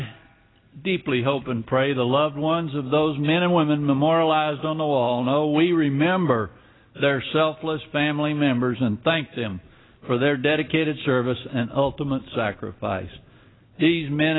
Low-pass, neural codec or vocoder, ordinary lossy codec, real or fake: 7.2 kHz; none; AAC, 16 kbps; real